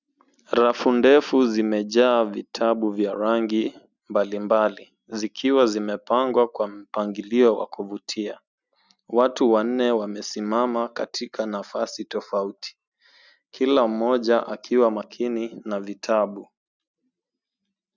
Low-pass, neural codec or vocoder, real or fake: 7.2 kHz; none; real